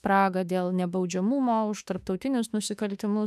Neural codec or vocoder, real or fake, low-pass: autoencoder, 48 kHz, 32 numbers a frame, DAC-VAE, trained on Japanese speech; fake; 14.4 kHz